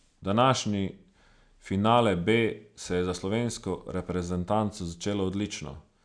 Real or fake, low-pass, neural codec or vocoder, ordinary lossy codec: real; 9.9 kHz; none; none